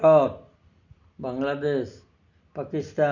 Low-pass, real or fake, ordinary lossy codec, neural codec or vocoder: 7.2 kHz; real; none; none